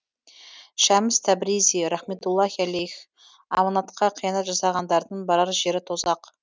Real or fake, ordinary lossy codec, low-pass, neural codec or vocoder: real; none; none; none